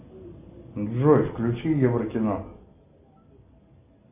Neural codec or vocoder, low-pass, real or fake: none; 3.6 kHz; real